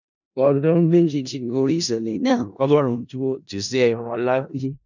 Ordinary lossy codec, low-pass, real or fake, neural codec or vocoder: none; 7.2 kHz; fake; codec, 16 kHz in and 24 kHz out, 0.4 kbps, LongCat-Audio-Codec, four codebook decoder